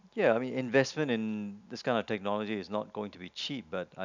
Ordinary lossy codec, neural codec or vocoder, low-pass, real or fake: none; none; 7.2 kHz; real